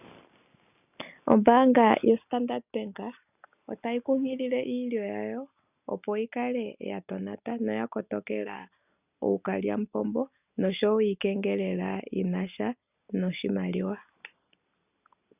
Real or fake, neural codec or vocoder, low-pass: real; none; 3.6 kHz